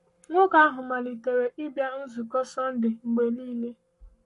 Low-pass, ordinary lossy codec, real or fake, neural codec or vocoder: 14.4 kHz; MP3, 48 kbps; fake; codec, 44.1 kHz, 7.8 kbps, Pupu-Codec